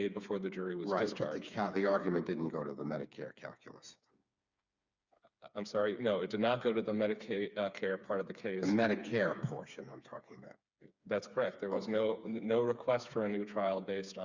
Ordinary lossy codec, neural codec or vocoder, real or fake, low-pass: Opus, 64 kbps; codec, 16 kHz, 4 kbps, FreqCodec, smaller model; fake; 7.2 kHz